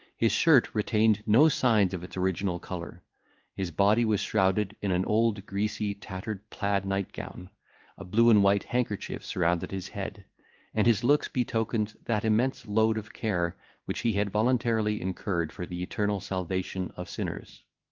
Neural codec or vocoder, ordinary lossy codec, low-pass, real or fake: codec, 16 kHz in and 24 kHz out, 1 kbps, XY-Tokenizer; Opus, 32 kbps; 7.2 kHz; fake